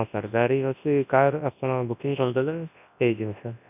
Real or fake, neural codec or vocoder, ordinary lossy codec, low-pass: fake; codec, 24 kHz, 0.9 kbps, WavTokenizer, large speech release; none; 3.6 kHz